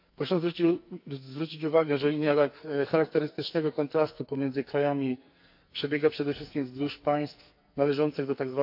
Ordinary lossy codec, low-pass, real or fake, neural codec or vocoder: AAC, 48 kbps; 5.4 kHz; fake; codec, 44.1 kHz, 2.6 kbps, SNAC